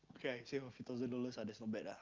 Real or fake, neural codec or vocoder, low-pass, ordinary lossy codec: real; none; 7.2 kHz; Opus, 24 kbps